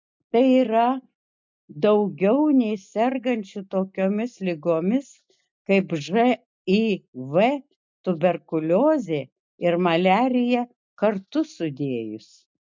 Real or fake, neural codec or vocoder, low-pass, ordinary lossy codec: real; none; 7.2 kHz; MP3, 64 kbps